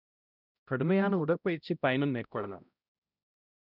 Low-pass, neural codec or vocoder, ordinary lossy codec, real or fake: 5.4 kHz; codec, 16 kHz, 0.5 kbps, X-Codec, HuBERT features, trained on balanced general audio; none; fake